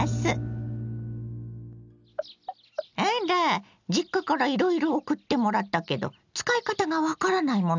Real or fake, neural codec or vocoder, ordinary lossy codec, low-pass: real; none; none; 7.2 kHz